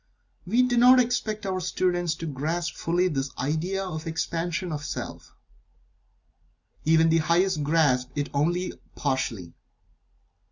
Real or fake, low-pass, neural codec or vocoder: real; 7.2 kHz; none